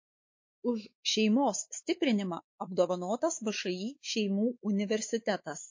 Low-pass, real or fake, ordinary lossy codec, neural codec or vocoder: 7.2 kHz; fake; MP3, 32 kbps; codec, 16 kHz, 4 kbps, X-Codec, WavLM features, trained on Multilingual LibriSpeech